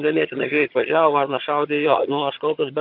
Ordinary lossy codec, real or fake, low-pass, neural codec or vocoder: AAC, 48 kbps; fake; 5.4 kHz; vocoder, 22.05 kHz, 80 mel bands, HiFi-GAN